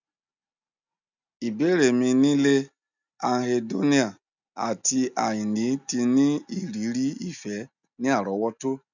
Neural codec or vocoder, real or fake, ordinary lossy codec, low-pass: none; real; none; 7.2 kHz